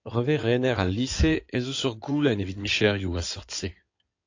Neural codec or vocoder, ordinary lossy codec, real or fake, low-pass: codec, 16 kHz in and 24 kHz out, 2.2 kbps, FireRedTTS-2 codec; AAC, 48 kbps; fake; 7.2 kHz